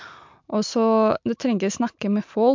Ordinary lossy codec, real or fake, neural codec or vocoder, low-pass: MP3, 64 kbps; real; none; 7.2 kHz